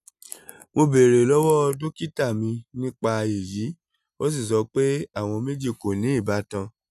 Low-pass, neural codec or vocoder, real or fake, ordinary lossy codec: 14.4 kHz; none; real; none